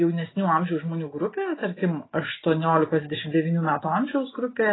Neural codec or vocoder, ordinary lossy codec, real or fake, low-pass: none; AAC, 16 kbps; real; 7.2 kHz